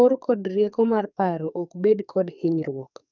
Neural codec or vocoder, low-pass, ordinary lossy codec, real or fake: codec, 44.1 kHz, 2.6 kbps, SNAC; 7.2 kHz; none; fake